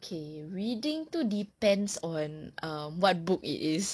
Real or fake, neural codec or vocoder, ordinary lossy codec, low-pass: real; none; none; none